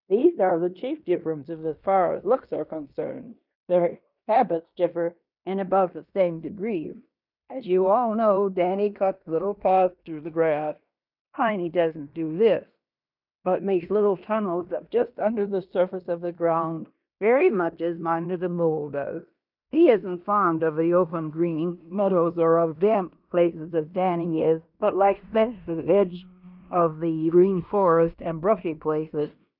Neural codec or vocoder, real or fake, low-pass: codec, 16 kHz in and 24 kHz out, 0.9 kbps, LongCat-Audio-Codec, fine tuned four codebook decoder; fake; 5.4 kHz